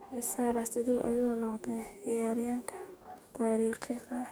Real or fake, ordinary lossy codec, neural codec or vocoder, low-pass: fake; none; codec, 44.1 kHz, 2.6 kbps, DAC; none